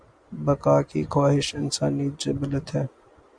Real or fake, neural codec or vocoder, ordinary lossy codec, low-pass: real; none; Opus, 64 kbps; 9.9 kHz